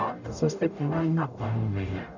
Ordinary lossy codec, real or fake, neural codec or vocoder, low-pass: none; fake; codec, 44.1 kHz, 0.9 kbps, DAC; 7.2 kHz